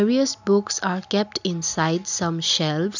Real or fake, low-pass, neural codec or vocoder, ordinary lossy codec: real; 7.2 kHz; none; none